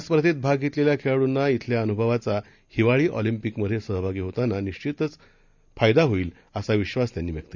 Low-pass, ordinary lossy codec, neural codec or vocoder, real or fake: 7.2 kHz; none; none; real